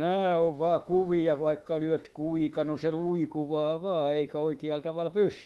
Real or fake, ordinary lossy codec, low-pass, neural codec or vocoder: fake; Opus, 32 kbps; 19.8 kHz; autoencoder, 48 kHz, 32 numbers a frame, DAC-VAE, trained on Japanese speech